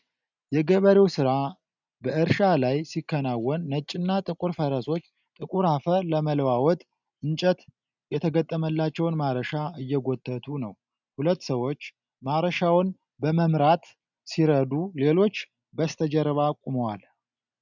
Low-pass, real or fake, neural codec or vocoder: 7.2 kHz; real; none